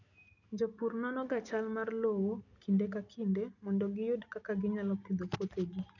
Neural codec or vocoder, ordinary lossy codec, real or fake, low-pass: none; none; real; 7.2 kHz